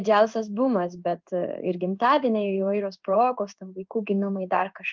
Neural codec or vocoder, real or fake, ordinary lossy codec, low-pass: codec, 16 kHz in and 24 kHz out, 1 kbps, XY-Tokenizer; fake; Opus, 24 kbps; 7.2 kHz